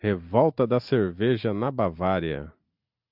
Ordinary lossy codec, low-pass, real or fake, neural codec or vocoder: AAC, 48 kbps; 5.4 kHz; real; none